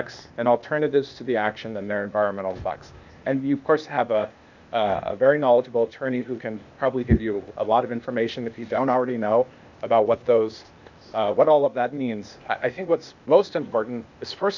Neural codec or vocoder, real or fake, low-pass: codec, 16 kHz, 0.8 kbps, ZipCodec; fake; 7.2 kHz